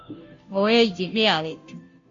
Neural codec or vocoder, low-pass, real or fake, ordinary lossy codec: codec, 16 kHz, 0.5 kbps, FunCodec, trained on Chinese and English, 25 frames a second; 7.2 kHz; fake; AAC, 64 kbps